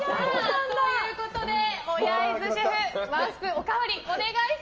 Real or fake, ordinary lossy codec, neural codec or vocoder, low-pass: real; Opus, 24 kbps; none; 7.2 kHz